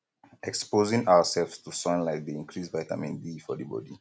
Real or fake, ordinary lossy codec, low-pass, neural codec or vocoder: real; none; none; none